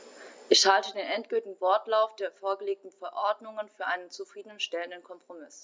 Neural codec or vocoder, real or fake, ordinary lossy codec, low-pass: none; real; none; none